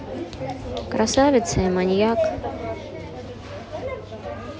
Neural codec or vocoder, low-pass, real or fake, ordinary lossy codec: none; none; real; none